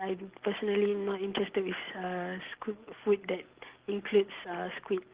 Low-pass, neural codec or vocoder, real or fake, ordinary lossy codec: 3.6 kHz; none; real; Opus, 16 kbps